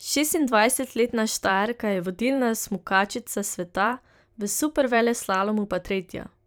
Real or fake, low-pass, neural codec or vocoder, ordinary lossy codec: fake; none; vocoder, 44.1 kHz, 128 mel bands every 512 samples, BigVGAN v2; none